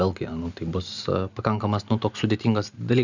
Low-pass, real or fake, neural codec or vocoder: 7.2 kHz; real; none